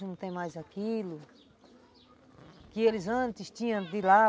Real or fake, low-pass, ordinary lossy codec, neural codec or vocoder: real; none; none; none